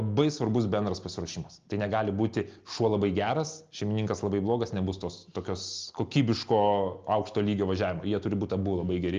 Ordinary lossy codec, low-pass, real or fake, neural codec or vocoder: Opus, 24 kbps; 7.2 kHz; real; none